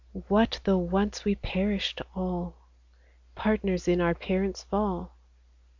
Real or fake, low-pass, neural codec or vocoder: real; 7.2 kHz; none